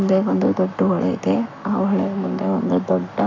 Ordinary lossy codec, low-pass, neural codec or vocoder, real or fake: AAC, 48 kbps; 7.2 kHz; codec, 44.1 kHz, 7.8 kbps, Pupu-Codec; fake